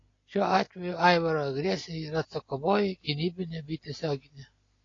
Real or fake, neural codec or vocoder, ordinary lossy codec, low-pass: real; none; AAC, 32 kbps; 7.2 kHz